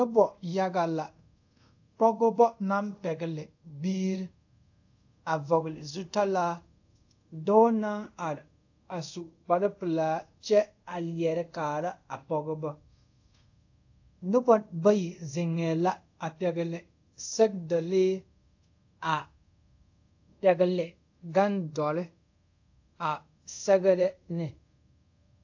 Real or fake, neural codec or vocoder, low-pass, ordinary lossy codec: fake; codec, 24 kHz, 0.5 kbps, DualCodec; 7.2 kHz; AAC, 48 kbps